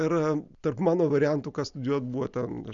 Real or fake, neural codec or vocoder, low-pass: real; none; 7.2 kHz